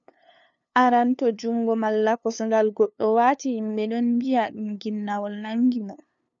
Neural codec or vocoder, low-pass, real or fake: codec, 16 kHz, 2 kbps, FunCodec, trained on LibriTTS, 25 frames a second; 7.2 kHz; fake